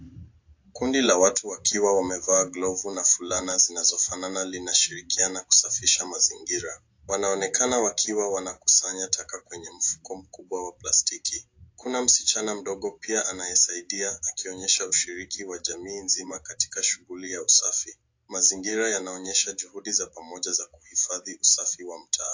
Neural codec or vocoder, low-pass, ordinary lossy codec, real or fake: none; 7.2 kHz; AAC, 48 kbps; real